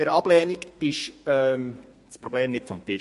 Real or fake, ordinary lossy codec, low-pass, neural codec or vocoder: fake; MP3, 48 kbps; 14.4 kHz; codec, 32 kHz, 1.9 kbps, SNAC